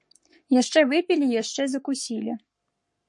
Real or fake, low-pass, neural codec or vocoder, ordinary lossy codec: fake; 10.8 kHz; codec, 44.1 kHz, 7.8 kbps, Pupu-Codec; MP3, 48 kbps